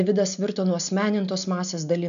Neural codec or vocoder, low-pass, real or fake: none; 7.2 kHz; real